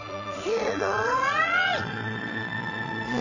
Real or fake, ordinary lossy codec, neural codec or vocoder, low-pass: fake; none; vocoder, 22.05 kHz, 80 mel bands, Vocos; 7.2 kHz